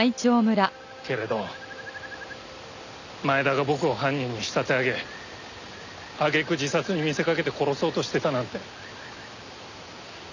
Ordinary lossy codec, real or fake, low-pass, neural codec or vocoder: none; real; 7.2 kHz; none